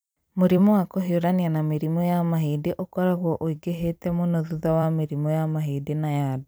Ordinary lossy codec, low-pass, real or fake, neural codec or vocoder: none; none; fake; vocoder, 44.1 kHz, 128 mel bands every 256 samples, BigVGAN v2